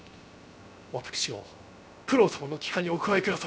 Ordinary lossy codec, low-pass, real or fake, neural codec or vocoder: none; none; fake; codec, 16 kHz, 0.7 kbps, FocalCodec